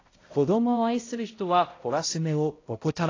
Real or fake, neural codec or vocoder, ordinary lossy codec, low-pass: fake; codec, 16 kHz, 0.5 kbps, X-Codec, HuBERT features, trained on balanced general audio; AAC, 32 kbps; 7.2 kHz